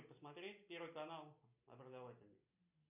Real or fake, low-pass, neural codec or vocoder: real; 3.6 kHz; none